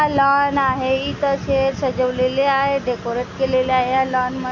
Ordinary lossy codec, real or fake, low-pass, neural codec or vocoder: MP3, 48 kbps; real; 7.2 kHz; none